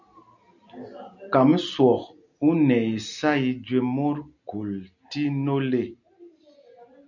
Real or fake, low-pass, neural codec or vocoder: real; 7.2 kHz; none